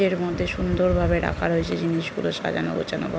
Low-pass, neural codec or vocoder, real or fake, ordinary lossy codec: none; none; real; none